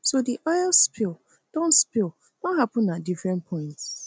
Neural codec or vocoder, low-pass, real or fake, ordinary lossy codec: none; none; real; none